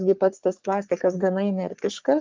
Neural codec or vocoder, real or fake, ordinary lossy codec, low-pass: codec, 44.1 kHz, 3.4 kbps, Pupu-Codec; fake; Opus, 24 kbps; 7.2 kHz